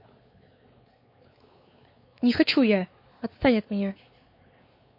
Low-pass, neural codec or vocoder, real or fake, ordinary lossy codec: 5.4 kHz; codec, 16 kHz, 4 kbps, X-Codec, WavLM features, trained on Multilingual LibriSpeech; fake; MP3, 32 kbps